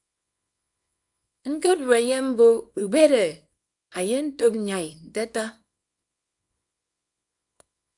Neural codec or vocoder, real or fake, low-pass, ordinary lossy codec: codec, 24 kHz, 0.9 kbps, WavTokenizer, small release; fake; 10.8 kHz; AAC, 64 kbps